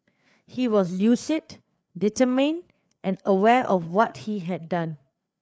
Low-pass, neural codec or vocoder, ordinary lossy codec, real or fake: none; codec, 16 kHz, 4 kbps, FreqCodec, larger model; none; fake